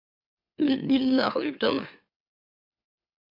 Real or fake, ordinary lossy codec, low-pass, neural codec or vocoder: fake; MP3, 48 kbps; 5.4 kHz; autoencoder, 44.1 kHz, a latent of 192 numbers a frame, MeloTTS